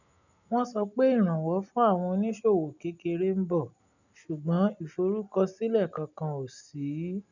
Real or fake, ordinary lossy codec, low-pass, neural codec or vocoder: real; none; 7.2 kHz; none